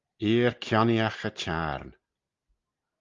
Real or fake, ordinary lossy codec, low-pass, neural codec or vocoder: real; Opus, 32 kbps; 7.2 kHz; none